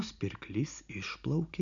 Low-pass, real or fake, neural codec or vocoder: 7.2 kHz; real; none